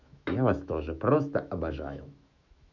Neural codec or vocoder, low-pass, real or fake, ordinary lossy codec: autoencoder, 48 kHz, 128 numbers a frame, DAC-VAE, trained on Japanese speech; 7.2 kHz; fake; none